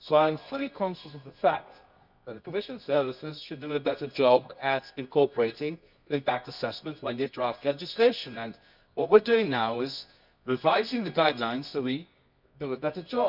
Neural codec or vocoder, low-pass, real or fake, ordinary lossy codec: codec, 24 kHz, 0.9 kbps, WavTokenizer, medium music audio release; 5.4 kHz; fake; none